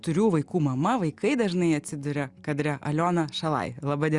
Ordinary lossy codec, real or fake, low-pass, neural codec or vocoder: Opus, 64 kbps; real; 10.8 kHz; none